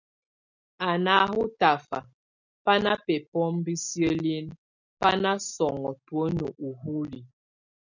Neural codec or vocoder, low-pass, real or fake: none; 7.2 kHz; real